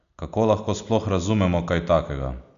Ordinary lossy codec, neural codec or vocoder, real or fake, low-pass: none; none; real; 7.2 kHz